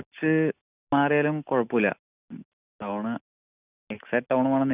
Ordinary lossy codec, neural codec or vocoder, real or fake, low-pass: none; none; real; 3.6 kHz